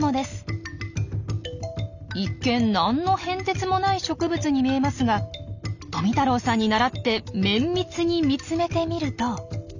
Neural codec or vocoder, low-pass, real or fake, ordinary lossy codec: none; 7.2 kHz; real; none